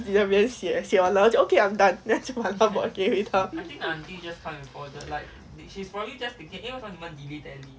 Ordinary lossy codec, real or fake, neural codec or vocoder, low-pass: none; real; none; none